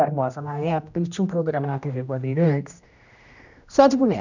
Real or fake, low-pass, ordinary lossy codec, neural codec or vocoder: fake; 7.2 kHz; none; codec, 16 kHz, 1 kbps, X-Codec, HuBERT features, trained on general audio